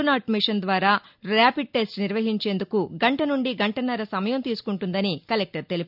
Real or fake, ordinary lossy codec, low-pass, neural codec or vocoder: real; none; 5.4 kHz; none